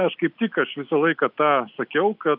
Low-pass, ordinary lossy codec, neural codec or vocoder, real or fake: 5.4 kHz; AAC, 48 kbps; none; real